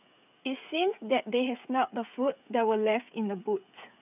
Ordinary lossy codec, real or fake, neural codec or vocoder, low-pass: none; fake; codec, 16 kHz, 8 kbps, FreqCodec, smaller model; 3.6 kHz